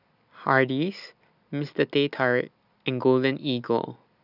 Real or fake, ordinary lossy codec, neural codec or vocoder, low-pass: real; none; none; 5.4 kHz